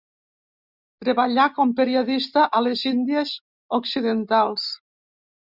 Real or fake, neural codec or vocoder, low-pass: real; none; 5.4 kHz